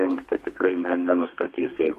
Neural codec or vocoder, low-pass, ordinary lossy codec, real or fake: codec, 32 kHz, 1.9 kbps, SNAC; 14.4 kHz; AAC, 48 kbps; fake